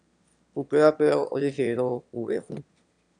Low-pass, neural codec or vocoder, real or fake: 9.9 kHz; autoencoder, 22.05 kHz, a latent of 192 numbers a frame, VITS, trained on one speaker; fake